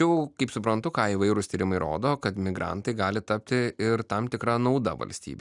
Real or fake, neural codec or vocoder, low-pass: real; none; 10.8 kHz